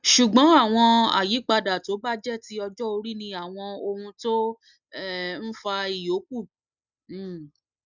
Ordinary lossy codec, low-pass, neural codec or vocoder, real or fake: none; 7.2 kHz; none; real